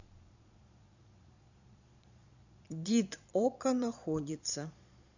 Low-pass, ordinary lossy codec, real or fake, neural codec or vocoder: 7.2 kHz; none; fake; vocoder, 22.05 kHz, 80 mel bands, Vocos